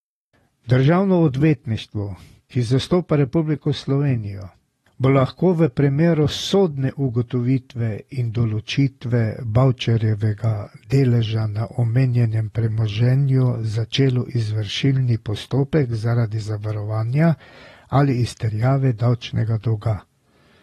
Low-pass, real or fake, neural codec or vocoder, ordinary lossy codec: 19.8 kHz; real; none; AAC, 32 kbps